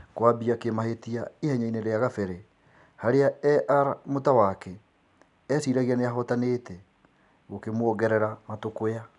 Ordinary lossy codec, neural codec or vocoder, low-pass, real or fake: none; none; 10.8 kHz; real